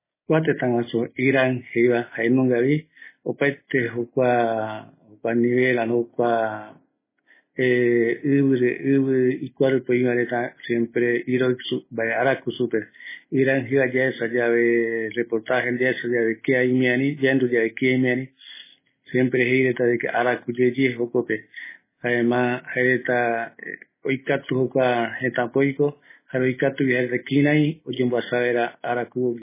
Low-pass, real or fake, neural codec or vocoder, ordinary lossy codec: 3.6 kHz; real; none; MP3, 16 kbps